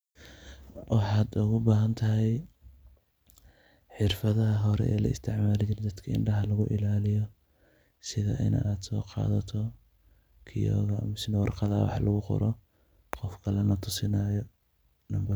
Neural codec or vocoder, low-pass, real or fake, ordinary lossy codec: none; none; real; none